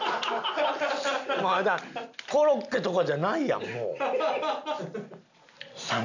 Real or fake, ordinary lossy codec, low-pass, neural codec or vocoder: real; none; 7.2 kHz; none